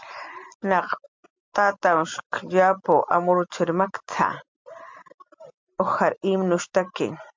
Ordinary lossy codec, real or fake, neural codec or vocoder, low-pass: MP3, 64 kbps; real; none; 7.2 kHz